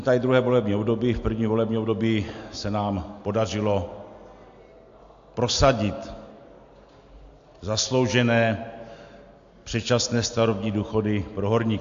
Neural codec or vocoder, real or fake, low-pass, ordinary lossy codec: none; real; 7.2 kHz; AAC, 64 kbps